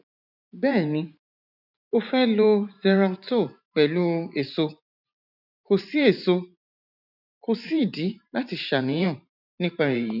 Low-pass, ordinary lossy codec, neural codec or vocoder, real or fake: 5.4 kHz; none; vocoder, 44.1 kHz, 128 mel bands every 512 samples, BigVGAN v2; fake